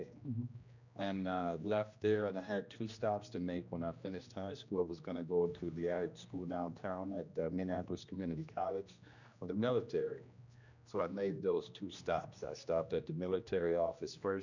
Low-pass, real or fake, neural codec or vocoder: 7.2 kHz; fake; codec, 16 kHz, 1 kbps, X-Codec, HuBERT features, trained on general audio